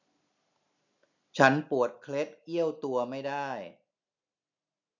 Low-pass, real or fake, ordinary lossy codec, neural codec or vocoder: 7.2 kHz; real; none; none